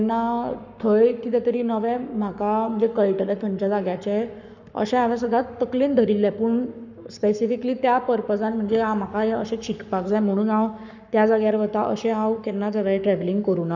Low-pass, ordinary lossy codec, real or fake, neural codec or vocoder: 7.2 kHz; none; fake; codec, 44.1 kHz, 7.8 kbps, Pupu-Codec